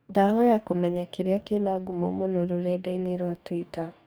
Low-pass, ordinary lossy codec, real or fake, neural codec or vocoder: none; none; fake; codec, 44.1 kHz, 2.6 kbps, DAC